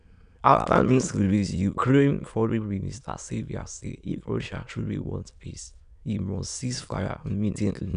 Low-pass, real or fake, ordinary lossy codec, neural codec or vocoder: 9.9 kHz; fake; none; autoencoder, 22.05 kHz, a latent of 192 numbers a frame, VITS, trained on many speakers